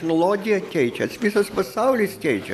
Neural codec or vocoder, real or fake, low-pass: none; real; 14.4 kHz